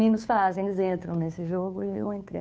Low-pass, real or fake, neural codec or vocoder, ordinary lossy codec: none; fake; codec, 16 kHz, 2 kbps, FunCodec, trained on Chinese and English, 25 frames a second; none